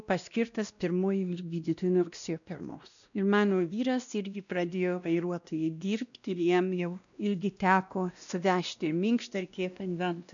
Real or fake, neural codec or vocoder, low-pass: fake; codec, 16 kHz, 1 kbps, X-Codec, WavLM features, trained on Multilingual LibriSpeech; 7.2 kHz